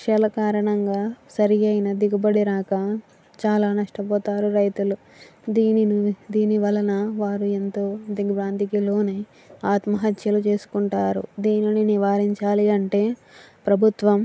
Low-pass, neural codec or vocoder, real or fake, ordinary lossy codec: none; none; real; none